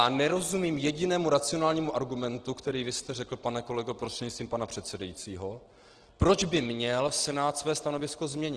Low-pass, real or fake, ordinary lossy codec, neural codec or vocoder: 9.9 kHz; real; Opus, 16 kbps; none